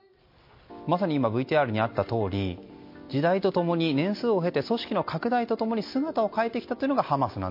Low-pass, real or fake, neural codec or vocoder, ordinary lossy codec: 5.4 kHz; real; none; MP3, 32 kbps